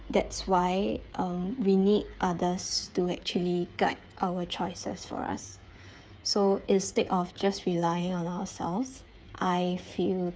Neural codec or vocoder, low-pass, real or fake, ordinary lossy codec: codec, 16 kHz, 8 kbps, FreqCodec, smaller model; none; fake; none